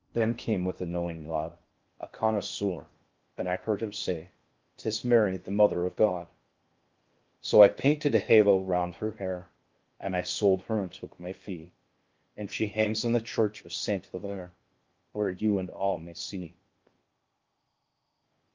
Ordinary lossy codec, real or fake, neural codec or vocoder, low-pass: Opus, 32 kbps; fake; codec, 16 kHz in and 24 kHz out, 0.6 kbps, FocalCodec, streaming, 4096 codes; 7.2 kHz